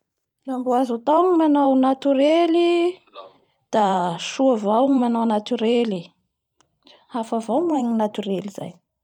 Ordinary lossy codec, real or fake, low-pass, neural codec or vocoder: none; fake; 19.8 kHz; vocoder, 44.1 kHz, 128 mel bands every 512 samples, BigVGAN v2